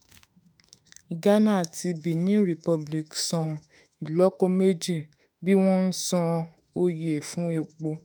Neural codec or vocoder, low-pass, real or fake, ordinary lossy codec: autoencoder, 48 kHz, 32 numbers a frame, DAC-VAE, trained on Japanese speech; none; fake; none